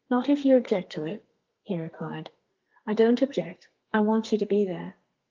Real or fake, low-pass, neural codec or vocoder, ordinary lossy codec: fake; 7.2 kHz; codec, 32 kHz, 1.9 kbps, SNAC; Opus, 24 kbps